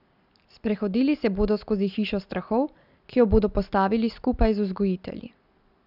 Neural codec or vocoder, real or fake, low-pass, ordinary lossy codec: none; real; 5.4 kHz; none